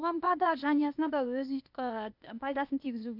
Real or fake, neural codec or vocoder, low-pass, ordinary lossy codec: fake; codec, 16 kHz, 0.8 kbps, ZipCodec; 5.4 kHz; none